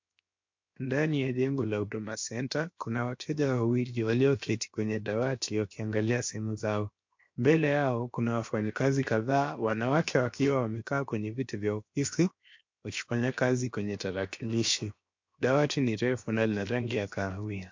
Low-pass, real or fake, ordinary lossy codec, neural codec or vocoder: 7.2 kHz; fake; MP3, 48 kbps; codec, 16 kHz, 0.7 kbps, FocalCodec